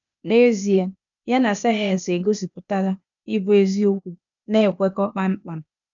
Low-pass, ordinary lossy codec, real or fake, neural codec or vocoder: 7.2 kHz; none; fake; codec, 16 kHz, 0.8 kbps, ZipCodec